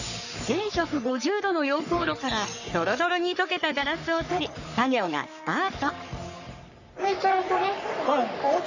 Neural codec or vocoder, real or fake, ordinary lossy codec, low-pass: codec, 44.1 kHz, 3.4 kbps, Pupu-Codec; fake; none; 7.2 kHz